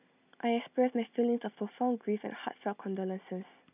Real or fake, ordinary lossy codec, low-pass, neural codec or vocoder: real; none; 3.6 kHz; none